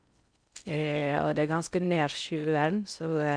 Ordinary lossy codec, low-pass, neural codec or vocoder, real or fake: none; 9.9 kHz; codec, 16 kHz in and 24 kHz out, 0.6 kbps, FocalCodec, streaming, 4096 codes; fake